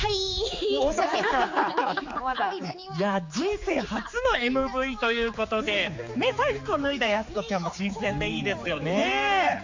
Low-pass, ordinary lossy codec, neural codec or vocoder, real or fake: 7.2 kHz; MP3, 48 kbps; codec, 16 kHz, 4 kbps, X-Codec, HuBERT features, trained on general audio; fake